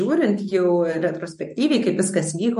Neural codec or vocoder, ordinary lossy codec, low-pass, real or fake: none; MP3, 48 kbps; 10.8 kHz; real